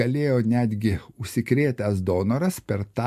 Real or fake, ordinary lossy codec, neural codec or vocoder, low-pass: real; MP3, 64 kbps; none; 14.4 kHz